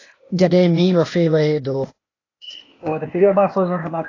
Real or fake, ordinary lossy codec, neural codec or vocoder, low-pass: fake; AAC, 32 kbps; codec, 16 kHz, 0.8 kbps, ZipCodec; 7.2 kHz